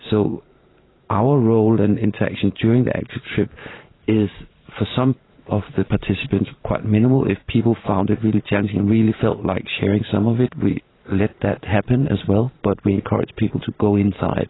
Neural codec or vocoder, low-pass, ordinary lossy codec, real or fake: vocoder, 44.1 kHz, 128 mel bands every 512 samples, BigVGAN v2; 7.2 kHz; AAC, 16 kbps; fake